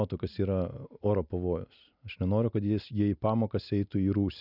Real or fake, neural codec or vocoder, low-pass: real; none; 5.4 kHz